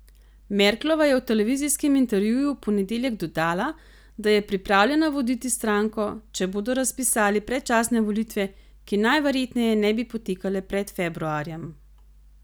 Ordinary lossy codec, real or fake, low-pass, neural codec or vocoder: none; real; none; none